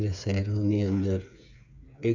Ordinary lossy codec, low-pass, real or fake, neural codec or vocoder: none; 7.2 kHz; fake; codec, 16 kHz, 8 kbps, FreqCodec, smaller model